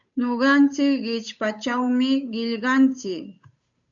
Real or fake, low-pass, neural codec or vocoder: fake; 7.2 kHz; codec, 16 kHz, 8 kbps, FunCodec, trained on Chinese and English, 25 frames a second